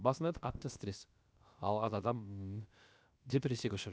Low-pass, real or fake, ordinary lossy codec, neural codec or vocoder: none; fake; none; codec, 16 kHz, about 1 kbps, DyCAST, with the encoder's durations